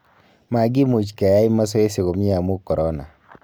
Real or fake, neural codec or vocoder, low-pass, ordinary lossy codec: real; none; none; none